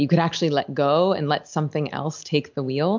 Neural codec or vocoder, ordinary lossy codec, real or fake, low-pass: none; MP3, 64 kbps; real; 7.2 kHz